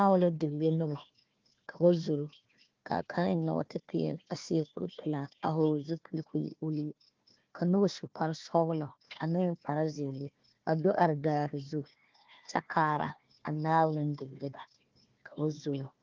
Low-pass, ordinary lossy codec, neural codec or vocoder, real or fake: 7.2 kHz; Opus, 32 kbps; codec, 16 kHz, 1 kbps, FunCodec, trained on Chinese and English, 50 frames a second; fake